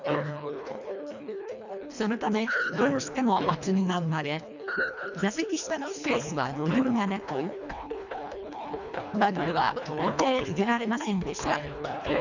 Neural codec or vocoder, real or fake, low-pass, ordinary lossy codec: codec, 24 kHz, 1.5 kbps, HILCodec; fake; 7.2 kHz; none